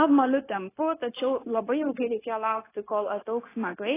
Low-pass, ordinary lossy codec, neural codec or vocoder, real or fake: 3.6 kHz; AAC, 16 kbps; codec, 16 kHz, 0.9 kbps, LongCat-Audio-Codec; fake